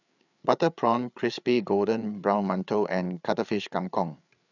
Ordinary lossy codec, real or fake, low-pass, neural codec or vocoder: none; fake; 7.2 kHz; codec, 16 kHz, 8 kbps, FreqCodec, larger model